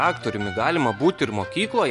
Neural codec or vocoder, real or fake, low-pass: none; real; 10.8 kHz